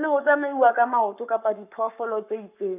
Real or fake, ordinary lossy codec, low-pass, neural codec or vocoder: fake; none; 3.6 kHz; codec, 44.1 kHz, 7.8 kbps, Pupu-Codec